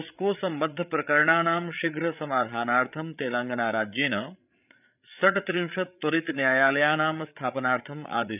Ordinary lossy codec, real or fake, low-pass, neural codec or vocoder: none; fake; 3.6 kHz; codec, 16 kHz, 8 kbps, FreqCodec, larger model